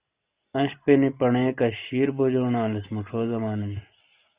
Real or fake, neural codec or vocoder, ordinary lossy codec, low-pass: fake; vocoder, 44.1 kHz, 128 mel bands every 256 samples, BigVGAN v2; Opus, 64 kbps; 3.6 kHz